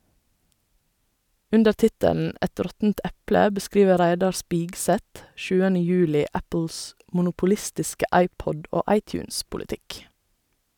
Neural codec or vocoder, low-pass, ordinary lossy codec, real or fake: none; 19.8 kHz; none; real